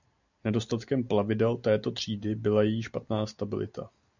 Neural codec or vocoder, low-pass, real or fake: none; 7.2 kHz; real